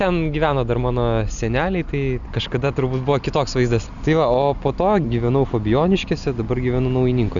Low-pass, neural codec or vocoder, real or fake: 7.2 kHz; none; real